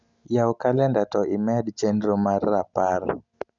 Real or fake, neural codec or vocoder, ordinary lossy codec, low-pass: real; none; none; 7.2 kHz